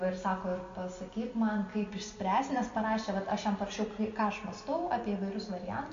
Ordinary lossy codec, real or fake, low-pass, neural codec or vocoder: MP3, 48 kbps; real; 7.2 kHz; none